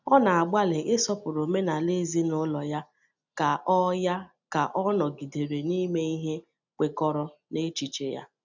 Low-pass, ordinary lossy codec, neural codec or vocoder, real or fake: 7.2 kHz; none; none; real